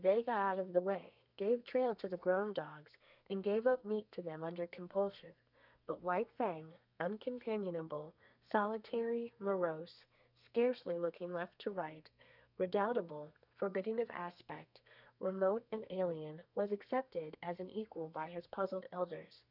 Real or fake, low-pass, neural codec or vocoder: fake; 5.4 kHz; codec, 32 kHz, 1.9 kbps, SNAC